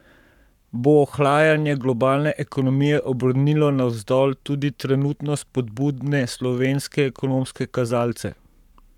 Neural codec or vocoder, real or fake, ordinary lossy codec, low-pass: codec, 44.1 kHz, 7.8 kbps, Pupu-Codec; fake; none; 19.8 kHz